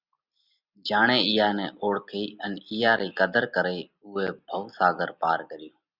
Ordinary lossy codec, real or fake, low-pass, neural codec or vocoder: Opus, 64 kbps; real; 5.4 kHz; none